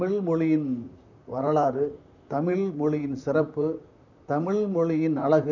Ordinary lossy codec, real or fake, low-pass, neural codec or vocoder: none; fake; 7.2 kHz; vocoder, 44.1 kHz, 128 mel bands, Pupu-Vocoder